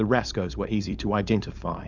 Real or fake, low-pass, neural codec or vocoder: fake; 7.2 kHz; codec, 16 kHz, 4.8 kbps, FACodec